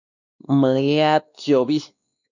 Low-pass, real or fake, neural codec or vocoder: 7.2 kHz; fake; codec, 16 kHz, 2 kbps, X-Codec, WavLM features, trained on Multilingual LibriSpeech